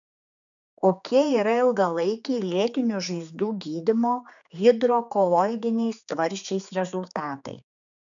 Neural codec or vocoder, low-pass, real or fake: codec, 16 kHz, 4 kbps, X-Codec, HuBERT features, trained on general audio; 7.2 kHz; fake